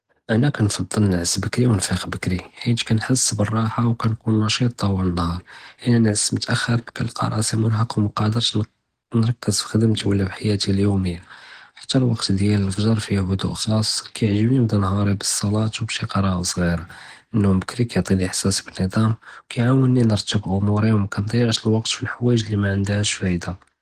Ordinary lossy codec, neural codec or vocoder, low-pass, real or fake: Opus, 16 kbps; none; 14.4 kHz; real